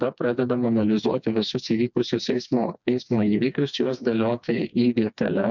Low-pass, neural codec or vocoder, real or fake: 7.2 kHz; codec, 16 kHz, 2 kbps, FreqCodec, smaller model; fake